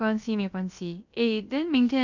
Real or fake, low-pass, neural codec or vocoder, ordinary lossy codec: fake; 7.2 kHz; codec, 16 kHz, about 1 kbps, DyCAST, with the encoder's durations; none